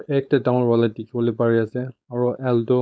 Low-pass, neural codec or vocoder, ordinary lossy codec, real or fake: none; codec, 16 kHz, 4.8 kbps, FACodec; none; fake